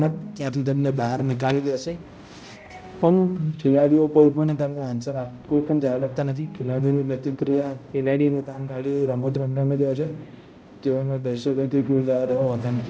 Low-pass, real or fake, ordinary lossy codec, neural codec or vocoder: none; fake; none; codec, 16 kHz, 0.5 kbps, X-Codec, HuBERT features, trained on balanced general audio